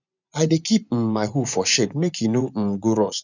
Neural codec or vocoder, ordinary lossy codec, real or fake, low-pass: vocoder, 24 kHz, 100 mel bands, Vocos; none; fake; 7.2 kHz